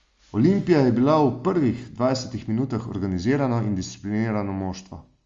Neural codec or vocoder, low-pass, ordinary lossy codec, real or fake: none; 7.2 kHz; Opus, 32 kbps; real